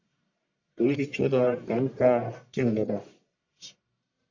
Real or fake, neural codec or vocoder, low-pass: fake; codec, 44.1 kHz, 1.7 kbps, Pupu-Codec; 7.2 kHz